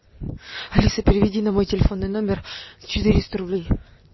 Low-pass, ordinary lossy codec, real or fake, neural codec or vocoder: 7.2 kHz; MP3, 24 kbps; real; none